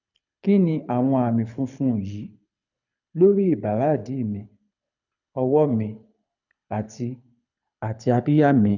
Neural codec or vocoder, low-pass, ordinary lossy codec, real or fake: codec, 24 kHz, 6 kbps, HILCodec; 7.2 kHz; none; fake